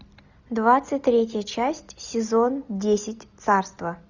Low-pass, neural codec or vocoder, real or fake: 7.2 kHz; none; real